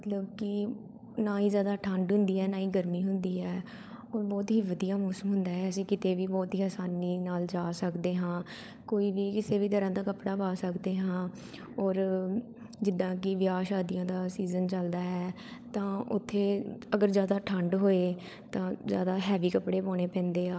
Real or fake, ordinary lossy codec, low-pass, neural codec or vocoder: fake; none; none; codec, 16 kHz, 16 kbps, FunCodec, trained on LibriTTS, 50 frames a second